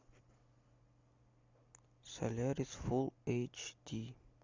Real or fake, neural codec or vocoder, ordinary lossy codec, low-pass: real; none; MP3, 64 kbps; 7.2 kHz